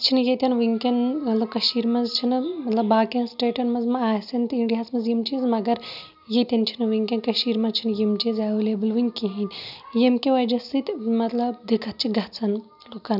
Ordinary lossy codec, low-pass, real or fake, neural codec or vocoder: none; 5.4 kHz; real; none